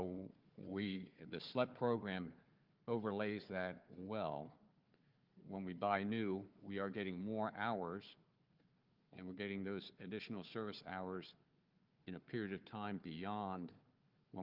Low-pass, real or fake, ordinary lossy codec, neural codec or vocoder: 5.4 kHz; fake; Opus, 32 kbps; codec, 16 kHz, 4 kbps, FunCodec, trained on Chinese and English, 50 frames a second